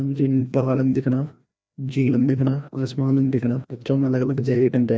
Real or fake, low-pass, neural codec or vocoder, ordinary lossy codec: fake; none; codec, 16 kHz, 1 kbps, FreqCodec, larger model; none